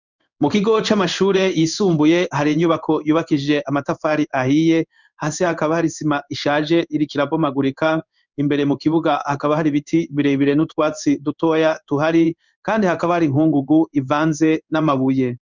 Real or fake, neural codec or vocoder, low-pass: fake; codec, 16 kHz in and 24 kHz out, 1 kbps, XY-Tokenizer; 7.2 kHz